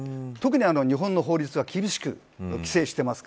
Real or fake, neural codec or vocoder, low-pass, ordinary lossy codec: real; none; none; none